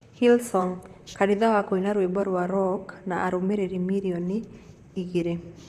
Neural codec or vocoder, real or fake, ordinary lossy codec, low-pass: vocoder, 44.1 kHz, 128 mel bands, Pupu-Vocoder; fake; none; 14.4 kHz